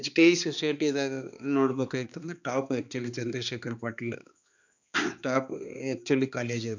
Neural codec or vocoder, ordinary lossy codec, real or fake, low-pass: codec, 16 kHz, 2 kbps, X-Codec, HuBERT features, trained on balanced general audio; none; fake; 7.2 kHz